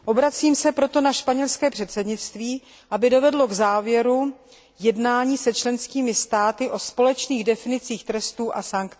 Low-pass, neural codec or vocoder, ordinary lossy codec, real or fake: none; none; none; real